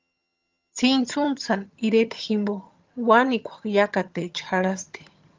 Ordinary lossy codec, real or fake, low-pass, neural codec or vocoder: Opus, 32 kbps; fake; 7.2 kHz; vocoder, 22.05 kHz, 80 mel bands, HiFi-GAN